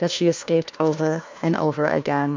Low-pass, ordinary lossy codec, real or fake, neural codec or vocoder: 7.2 kHz; MP3, 64 kbps; fake; codec, 16 kHz, 1 kbps, FunCodec, trained on LibriTTS, 50 frames a second